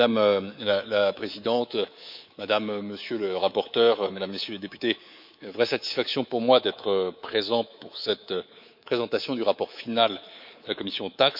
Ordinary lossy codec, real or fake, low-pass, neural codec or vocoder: none; fake; 5.4 kHz; codec, 24 kHz, 3.1 kbps, DualCodec